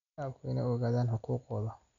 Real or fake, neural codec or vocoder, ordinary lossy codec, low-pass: real; none; none; 7.2 kHz